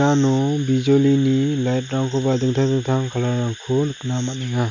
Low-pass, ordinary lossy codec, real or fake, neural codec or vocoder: 7.2 kHz; none; real; none